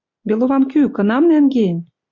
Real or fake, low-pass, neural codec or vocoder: real; 7.2 kHz; none